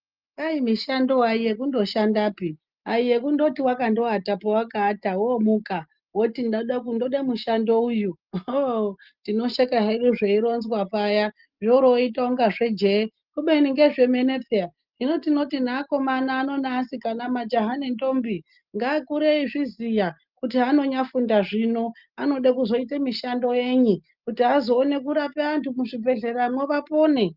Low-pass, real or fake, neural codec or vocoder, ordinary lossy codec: 5.4 kHz; real; none; Opus, 32 kbps